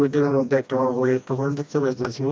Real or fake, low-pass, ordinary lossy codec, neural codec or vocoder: fake; none; none; codec, 16 kHz, 1 kbps, FreqCodec, smaller model